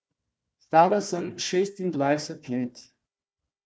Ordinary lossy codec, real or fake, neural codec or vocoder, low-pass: none; fake; codec, 16 kHz, 1 kbps, FunCodec, trained on Chinese and English, 50 frames a second; none